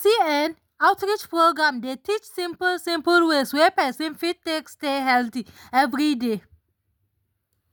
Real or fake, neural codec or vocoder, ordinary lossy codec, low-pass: real; none; none; none